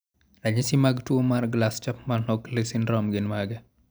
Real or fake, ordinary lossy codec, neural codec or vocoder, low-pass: real; none; none; none